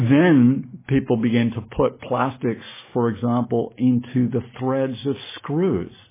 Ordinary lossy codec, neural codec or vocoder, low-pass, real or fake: MP3, 16 kbps; none; 3.6 kHz; real